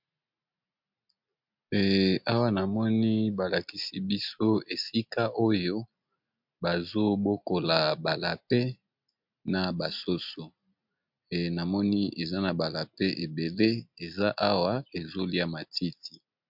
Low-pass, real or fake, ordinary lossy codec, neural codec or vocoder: 5.4 kHz; real; MP3, 48 kbps; none